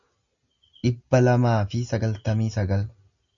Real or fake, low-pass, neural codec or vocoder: real; 7.2 kHz; none